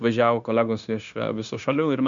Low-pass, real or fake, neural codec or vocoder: 7.2 kHz; fake; codec, 16 kHz, 0.9 kbps, LongCat-Audio-Codec